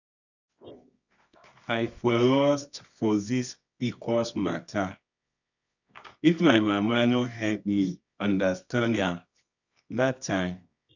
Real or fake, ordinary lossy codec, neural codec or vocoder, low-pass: fake; none; codec, 24 kHz, 0.9 kbps, WavTokenizer, medium music audio release; 7.2 kHz